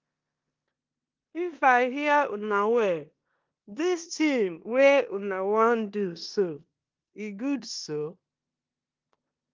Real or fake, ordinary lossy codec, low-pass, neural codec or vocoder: fake; Opus, 24 kbps; 7.2 kHz; codec, 16 kHz in and 24 kHz out, 0.9 kbps, LongCat-Audio-Codec, four codebook decoder